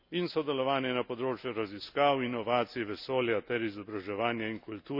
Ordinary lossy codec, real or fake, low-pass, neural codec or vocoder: none; real; 5.4 kHz; none